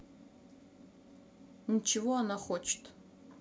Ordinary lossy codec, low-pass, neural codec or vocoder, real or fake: none; none; none; real